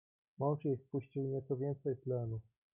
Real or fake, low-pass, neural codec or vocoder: real; 3.6 kHz; none